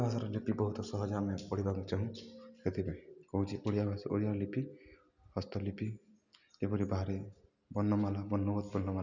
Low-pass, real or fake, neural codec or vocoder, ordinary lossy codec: 7.2 kHz; real; none; none